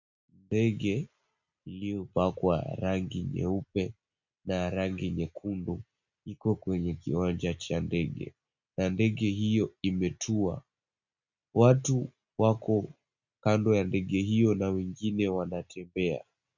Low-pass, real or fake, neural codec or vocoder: 7.2 kHz; real; none